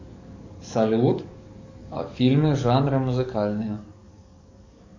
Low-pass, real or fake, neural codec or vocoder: 7.2 kHz; fake; codec, 44.1 kHz, 7.8 kbps, DAC